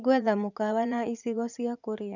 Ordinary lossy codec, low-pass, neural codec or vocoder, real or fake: none; 7.2 kHz; vocoder, 44.1 kHz, 80 mel bands, Vocos; fake